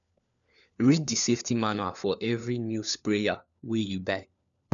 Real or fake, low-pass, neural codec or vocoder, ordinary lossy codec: fake; 7.2 kHz; codec, 16 kHz, 4 kbps, FunCodec, trained on LibriTTS, 50 frames a second; none